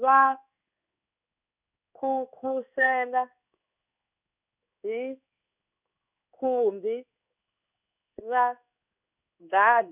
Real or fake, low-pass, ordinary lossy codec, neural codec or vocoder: fake; 3.6 kHz; none; codec, 16 kHz in and 24 kHz out, 1 kbps, XY-Tokenizer